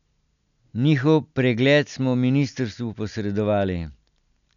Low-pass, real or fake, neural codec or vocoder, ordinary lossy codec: 7.2 kHz; real; none; none